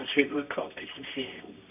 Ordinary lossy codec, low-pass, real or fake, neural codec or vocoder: none; 3.6 kHz; fake; codec, 24 kHz, 0.9 kbps, WavTokenizer, medium music audio release